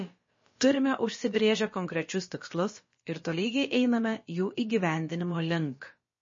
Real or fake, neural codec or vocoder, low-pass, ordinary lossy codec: fake; codec, 16 kHz, about 1 kbps, DyCAST, with the encoder's durations; 7.2 kHz; MP3, 32 kbps